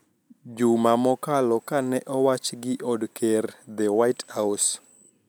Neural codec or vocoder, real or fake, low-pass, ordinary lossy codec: none; real; none; none